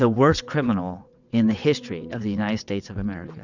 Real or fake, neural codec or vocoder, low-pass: fake; vocoder, 22.05 kHz, 80 mel bands, WaveNeXt; 7.2 kHz